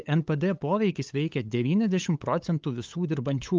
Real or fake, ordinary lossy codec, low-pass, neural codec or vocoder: fake; Opus, 32 kbps; 7.2 kHz; codec, 16 kHz, 8 kbps, FunCodec, trained on LibriTTS, 25 frames a second